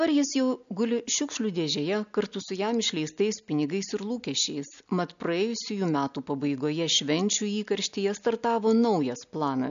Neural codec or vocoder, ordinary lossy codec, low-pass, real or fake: none; AAC, 96 kbps; 7.2 kHz; real